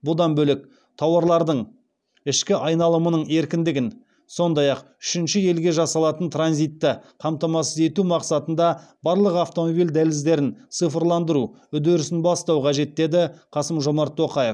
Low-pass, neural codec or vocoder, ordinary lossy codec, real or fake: none; none; none; real